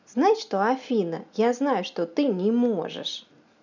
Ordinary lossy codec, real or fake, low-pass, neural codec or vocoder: none; real; 7.2 kHz; none